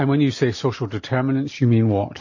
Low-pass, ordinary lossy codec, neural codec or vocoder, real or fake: 7.2 kHz; MP3, 32 kbps; none; real